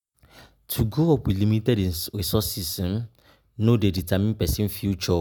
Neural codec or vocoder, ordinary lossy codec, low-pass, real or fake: none; none; none; real